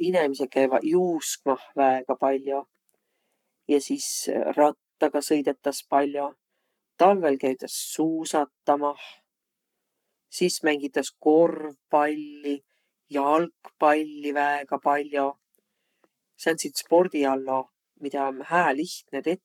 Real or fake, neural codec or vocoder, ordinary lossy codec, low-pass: fake; codec, 44.1 kHz, 7.8 kbps, Pupu-Codec; none; 19.8 kHz